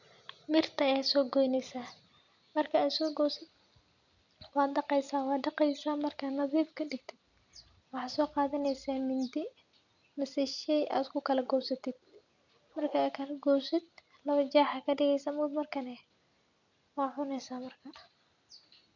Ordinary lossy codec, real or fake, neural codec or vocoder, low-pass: none; real; none; 7.2 kHz